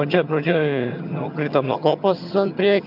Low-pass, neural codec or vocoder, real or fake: 5.4 kHz; vocoder, 22.05 kHz, 80 mel bands, HiFi-GAN; fake